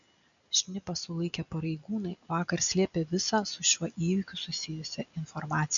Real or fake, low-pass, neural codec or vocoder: real; 7.2 kHz; none